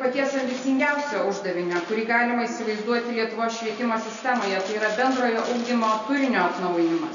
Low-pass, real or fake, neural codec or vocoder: 7.2 kHz; real; none